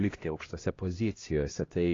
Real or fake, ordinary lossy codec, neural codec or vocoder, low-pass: fake; AAC, 32 kbps; codec, 16 kHz, 1 kbps, X-Codec, HuBERT features, trained on LibriSpeech; 7.2 kHz